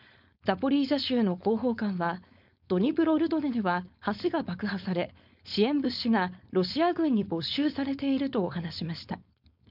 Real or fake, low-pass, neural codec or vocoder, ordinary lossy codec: fake; 5.4 kHz; codec, 16 kHz, 4.8 kbps, FACodec; none